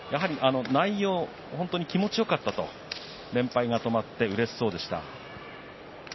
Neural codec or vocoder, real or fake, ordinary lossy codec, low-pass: none; real; MP3, 24 kbps; 7.2 kHz